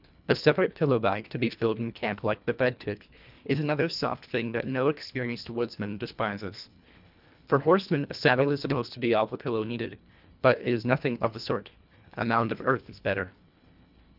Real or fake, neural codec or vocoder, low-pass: fake; codec, 24 kHz, 1.5 kbps, HILCodec; 5.4 kHz